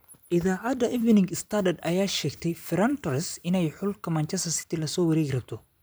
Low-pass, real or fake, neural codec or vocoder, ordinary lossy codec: none; fake; vocoder, 44.1 kHz, 128 mel bands every 256 samples, BigVGAN v2; none